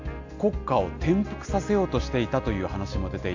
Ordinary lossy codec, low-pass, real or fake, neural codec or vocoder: AAC, 48 kbps; 7.2 kHz; real; none